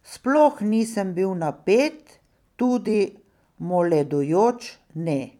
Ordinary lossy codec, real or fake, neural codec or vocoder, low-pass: none; fake; vocoder, 44.1 kHz, 128 mel bands every 256 samples, BigVGAN v2; 19.8 kHz